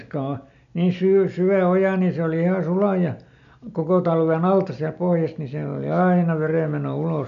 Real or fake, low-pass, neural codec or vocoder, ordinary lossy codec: real; 7.2 kHz; none; none